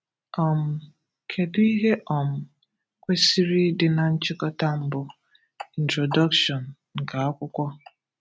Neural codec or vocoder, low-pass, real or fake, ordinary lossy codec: none; none; real; none